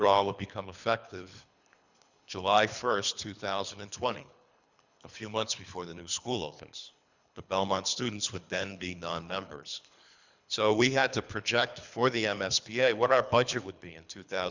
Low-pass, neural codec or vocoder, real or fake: 7.2 kHz; codec, 24 kHz, 3 kbps, HILCodec; fake